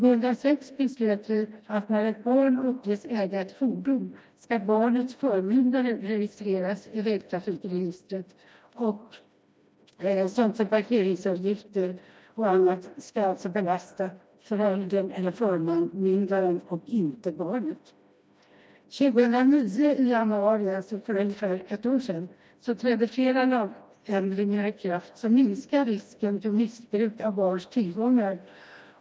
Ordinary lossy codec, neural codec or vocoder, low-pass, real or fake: none; codec, 16 kHz, 1 kbps, FreqCodec, smaller model; none; fake